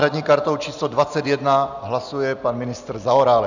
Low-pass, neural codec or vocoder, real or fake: 7.2 kHz; none; real